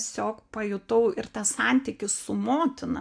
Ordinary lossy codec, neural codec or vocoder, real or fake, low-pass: AAC, 64 kbps; none; real; 9.9 kHz